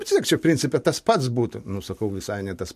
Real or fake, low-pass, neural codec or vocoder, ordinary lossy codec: real; 14.4 kHz; none; MP3, 64 kbps